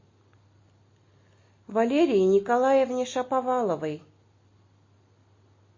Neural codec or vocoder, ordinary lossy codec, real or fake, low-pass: none; MP3, 32 kbps; real; 7.2 kHz